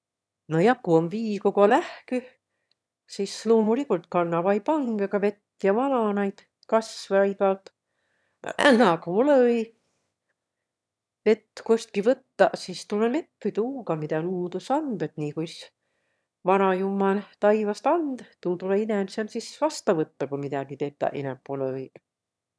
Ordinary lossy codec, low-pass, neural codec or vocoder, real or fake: none; none; autoencoder, 22.05 kHz, a latent of 192 numbers a frame, VITS, trained on one speaker; fake